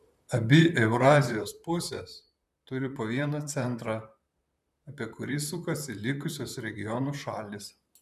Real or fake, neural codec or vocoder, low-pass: fake; vocoder, 44.1 kHz, 128 mel bands, Pupu-Vocoder; 14.4 kHz